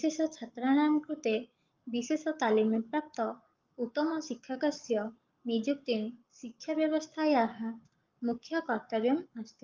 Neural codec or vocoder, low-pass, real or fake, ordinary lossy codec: codec, 44.1 kHz, 7.8 kbps, Pupu-Codec; 7.2 kHz; fake; Opus, 24 kbps